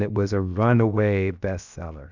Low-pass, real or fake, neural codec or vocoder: 7.2 kHz; fake; codec, 16 kHz, 0.7 kbps, FocalCodec